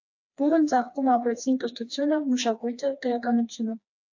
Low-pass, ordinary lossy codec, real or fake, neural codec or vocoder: 7.2 kHz; AAC, 48 kbps; fake; codec, 16 kHz, 2 kbps, FreqCodec, smaller model